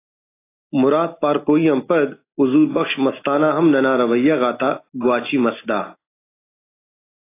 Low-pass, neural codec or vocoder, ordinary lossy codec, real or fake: 3.6 kHz; none; AAC, 24 kbps; real